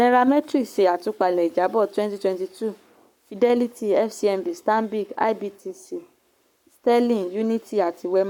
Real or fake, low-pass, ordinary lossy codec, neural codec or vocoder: fake; 19.8 kHz; none; codec, 44.1 kHz, 7.8 kbps, Pupu-Codec